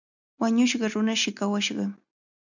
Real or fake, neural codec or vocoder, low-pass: real; none; 7.2 kHz